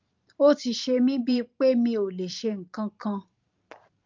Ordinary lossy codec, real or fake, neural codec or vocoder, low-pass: Opus, 32 kbps; fake; vocoder, 44.1 kHz, 128 mel bands every 512 samples, BigVGAN v2; 7.2 kHz